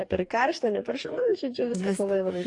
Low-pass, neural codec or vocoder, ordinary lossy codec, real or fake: 10.8 kHz; codec, 44.1 kHz, 2.6 kbps, DAC; AAC, 48 kbps; fake